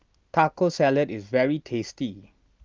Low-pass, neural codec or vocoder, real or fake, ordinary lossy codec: 7.2 kHz; none; real; Opus, 24 kbps